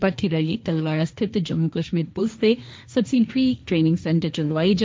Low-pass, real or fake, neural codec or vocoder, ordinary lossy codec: none; fake; codec, 16 kHz, 1.1 kbps, Voila-Tokenizer; none